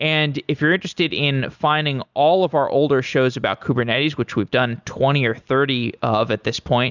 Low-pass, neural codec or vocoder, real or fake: 7.2 kHz; none; real